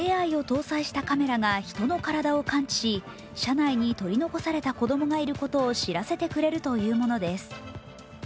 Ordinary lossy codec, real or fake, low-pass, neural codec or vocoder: none; real; none; none